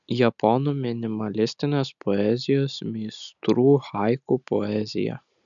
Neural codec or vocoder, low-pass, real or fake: none; 7.2 kHz; real